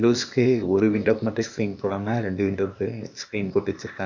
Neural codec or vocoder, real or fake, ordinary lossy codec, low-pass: codec, 16 kHz, 0.8 kbps, ZipCodec; fake; none; 7.2 kHz